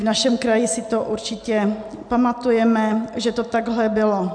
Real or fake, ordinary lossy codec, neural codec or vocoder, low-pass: real; MP3, 96 kbps; none; 9.9 kHz